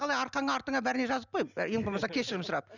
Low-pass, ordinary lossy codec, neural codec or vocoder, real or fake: 7.2 kHz; none; none; real